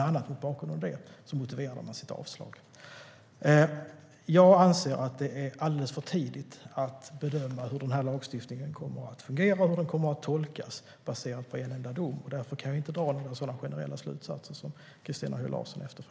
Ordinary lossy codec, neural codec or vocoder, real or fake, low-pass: none; none; real; none